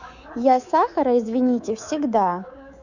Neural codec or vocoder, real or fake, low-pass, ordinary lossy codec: codec, 24 kHz, 3.1 kbps, DualCodec; fake; 7.2 kHz; none